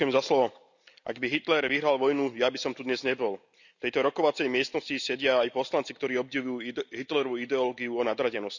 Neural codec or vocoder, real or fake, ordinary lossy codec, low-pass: none; real; none; 7.2 kHz